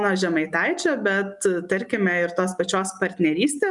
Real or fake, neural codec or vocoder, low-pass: real; none; 10.8 kHz